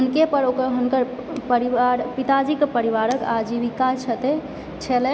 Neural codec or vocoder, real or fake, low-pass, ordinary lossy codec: none; real; none; none